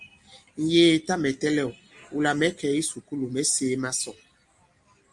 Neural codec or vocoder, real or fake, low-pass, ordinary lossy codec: none; real; 10.8 kHz; Opus, 32 kbps